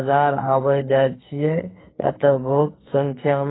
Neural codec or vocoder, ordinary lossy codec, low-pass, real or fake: codec, 44.1 kHz, 2.6 kbps, SNAC; AAC, 16 kbps; 7.2 kHz; fake